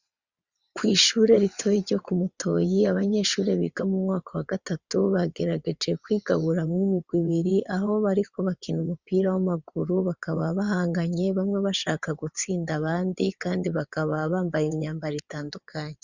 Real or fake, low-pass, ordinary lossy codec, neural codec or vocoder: fake; 7.2 kHz; Opus, 64 kbps; vocoder, 22.05 kHz, 80 mel bands, WaveNeXt